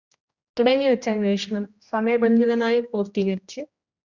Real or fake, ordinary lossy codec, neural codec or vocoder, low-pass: fake; Opus, 64 kbps; codec, 16 kHz, 1 kbps, X-Codec, HuBERT features, trained on general audio; 7.2 kHz